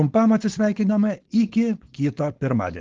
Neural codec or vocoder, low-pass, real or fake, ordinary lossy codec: codec, 16 kHz, 4.8 kbps, FACodec; 7.2 kHz; fake; Opus, 16 kbps